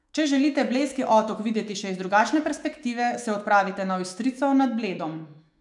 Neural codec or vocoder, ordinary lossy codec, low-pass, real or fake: autoencoder, 48 kHz, 128 numbers a frame, DAC-VAE, trained on Japanese speech; none; 10.8 kHz; fake